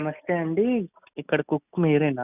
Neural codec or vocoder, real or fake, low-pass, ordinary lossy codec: none; real; 3.6 kHz; none